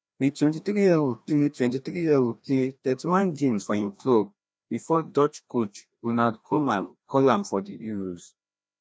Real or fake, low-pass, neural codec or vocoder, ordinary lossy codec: fake; none; codec, 16 kHz, 1 kbps, FreqCodec, larger model; none